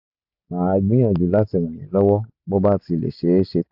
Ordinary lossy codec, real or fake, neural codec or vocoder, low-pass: AAC, 48 kbps; real; none; 5.4 kHz